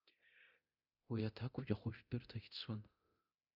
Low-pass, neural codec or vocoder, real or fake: 5.4 kHz; codec, 24 kHz, 0.9 kbps, DualCodec; fake